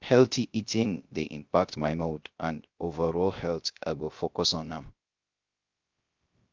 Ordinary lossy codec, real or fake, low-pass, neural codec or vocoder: Opus, 24 kbps; fake; 7.2 kHz; codec, 16 kHz, 0.3 kbps, FocalCodec